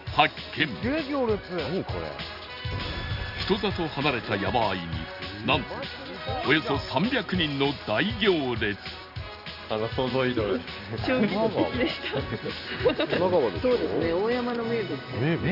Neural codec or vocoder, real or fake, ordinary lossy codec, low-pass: none; real; Opus, 64 kbps; 5.4 kHz